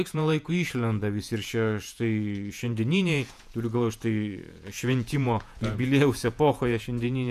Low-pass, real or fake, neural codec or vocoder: 14.4 kHz; fake; vocoder, 48 kHz, 128 mel bands, Vocos